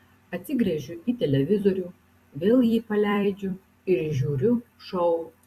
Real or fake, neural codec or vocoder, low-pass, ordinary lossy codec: fake; vocoder, 44.1 kHz, 128 mel bands every 256 samples, BigVGAN v2; 14.4 kHz; Opus, 64 kbps